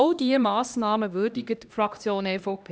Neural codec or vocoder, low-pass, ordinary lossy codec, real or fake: codec, 16 kHz, 1 kbps, X-Codec, HuBERT features, trained on LibriSpeech; none; none; fake